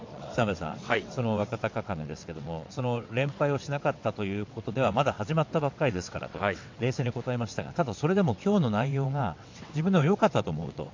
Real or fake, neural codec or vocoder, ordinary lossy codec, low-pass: fake; vocoder, 22.05 kHz, 80 mel bands, WaveNeXt; MP3, 48 kbps; 7.2 kHz